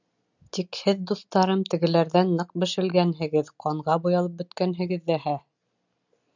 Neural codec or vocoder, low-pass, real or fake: none; 7.2 kHz; real